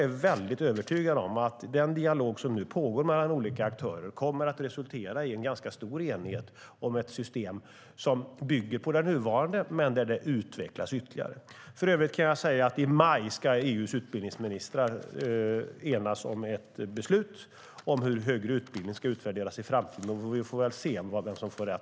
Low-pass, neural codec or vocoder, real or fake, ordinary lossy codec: none; none; real; none